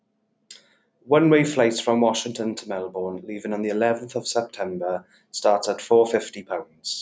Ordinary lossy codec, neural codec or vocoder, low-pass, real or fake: none; none; none; real